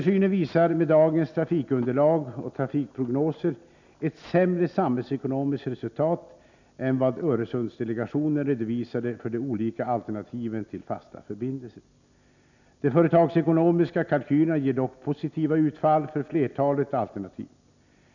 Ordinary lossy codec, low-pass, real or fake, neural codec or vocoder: none; 7.2 kHz; real; none